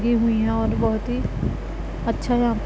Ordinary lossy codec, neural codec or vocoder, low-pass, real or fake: none; none; none; real